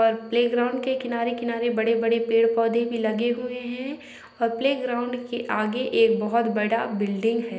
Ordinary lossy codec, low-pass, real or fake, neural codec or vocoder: none; none; real; none